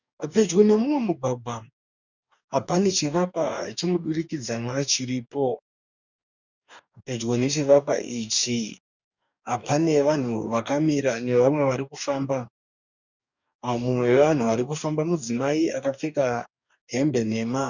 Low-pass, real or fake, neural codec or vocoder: 7.2 kHz; fake; codec, 44.1 kHz, 2.6 kbps, DAC